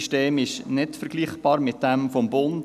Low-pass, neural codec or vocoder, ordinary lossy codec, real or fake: 14.4 kHz; none; none; real